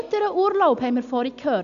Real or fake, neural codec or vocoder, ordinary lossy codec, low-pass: real; none; none; 7.2 kHz